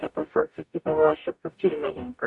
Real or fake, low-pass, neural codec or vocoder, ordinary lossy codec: fake; 10.8 kHz; codec, 44.1 kHz, 0.9 kbps, DAC; MP3, 64 kbps